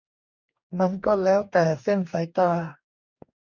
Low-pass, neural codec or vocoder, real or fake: 7.2 kHz; codec, 44.1 kHz, 2.6 kbps, DAC; fake